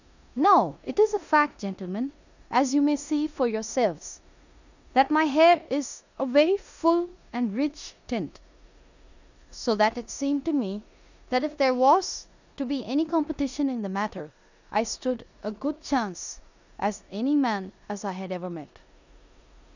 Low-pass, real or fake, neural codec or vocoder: 7.2 kHz; fake; codec, 16 kHz in and 24 kHz out, 0.9 kbps, LongCat-Audio-Codec, four codebook decoder